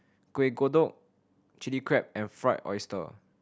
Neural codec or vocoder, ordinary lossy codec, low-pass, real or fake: none; none; none; real